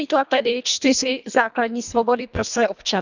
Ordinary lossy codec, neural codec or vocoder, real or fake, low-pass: none; codec, 24 kHz, 1.5 kbps, HILCodec; fake; 7.2 kHz